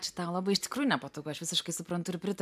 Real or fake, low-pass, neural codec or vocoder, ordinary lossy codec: real; 14.4 kHz; none; AAC, 96 kbps